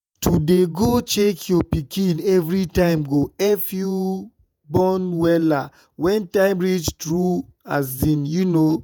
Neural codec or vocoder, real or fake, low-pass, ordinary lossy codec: vocoder, 48 kHz, 128 mel bands, Vocos; fake; none; none